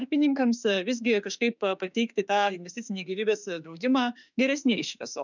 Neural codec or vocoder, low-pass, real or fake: autoencoder, 48 kHz, 32 numbers a frame, DAC-VAE, trained on Japanese speech; 7.2 kHz; fake